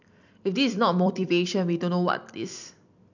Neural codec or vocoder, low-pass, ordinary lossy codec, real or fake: vocoder, 44.1 kHz, 128 mel bands every 256 samples, BigVGAN v2; 7.2 kHz; none; fake